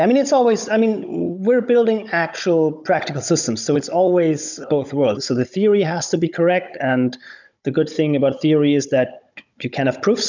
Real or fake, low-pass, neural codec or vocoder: fake; 7.2 kHz; codec, 16 kHz, 16 kbps, FunCodec, trained on Chinese and English, 50 frames a second